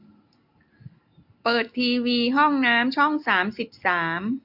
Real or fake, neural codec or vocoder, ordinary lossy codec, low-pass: real; none; AAC, 48 kbps; 5.4 kHz